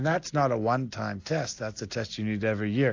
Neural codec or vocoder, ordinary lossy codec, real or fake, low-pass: none; AAC, 48 kbps; real; 7.2 kHz